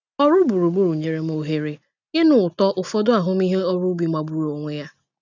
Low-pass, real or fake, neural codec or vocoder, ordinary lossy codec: 7.2 kHz; real; none; none